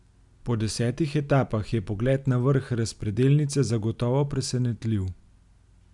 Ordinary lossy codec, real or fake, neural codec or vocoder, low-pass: none; real; none; 10.8 kHz